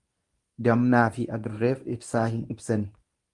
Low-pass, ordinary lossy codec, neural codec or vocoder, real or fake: 10.8 kHz; Opus, 32 kbps; codec, 24 kHz, 0.9 kbps, WavTokenizer, medium speech release version 1; fake